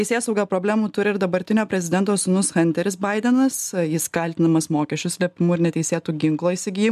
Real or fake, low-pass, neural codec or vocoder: real; 14.4 kHz; none